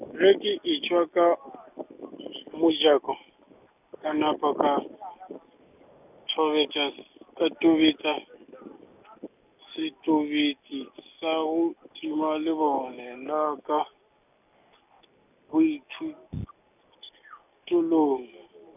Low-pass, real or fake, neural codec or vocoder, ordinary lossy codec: 3.6 kHz; real; none; none